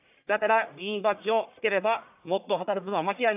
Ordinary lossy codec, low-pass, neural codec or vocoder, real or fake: AAC, 32 kbps; 3.6 kHz; codec, 44.1 kHz, 1.7 kbps, Pupu-Codec; fake